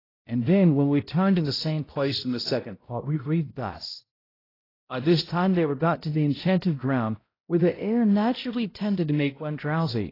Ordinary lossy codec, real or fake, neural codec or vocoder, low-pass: AAC, 24 kbps; fake; codec, 16 kHz, 0.5 kbps, X-Codec, HuBERT features, trained on balanced general audio; 5.4 kHz